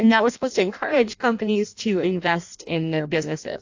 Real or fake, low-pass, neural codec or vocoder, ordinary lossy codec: fake; 7.2 kHz; codec, 16 kHz in and 24 kHz out, 0.6 kbps, FireRedTTS-2 codec; AAC, 48 kbps